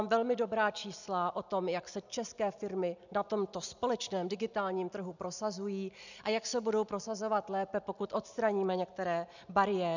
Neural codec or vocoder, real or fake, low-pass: none; real; 7.2 kHz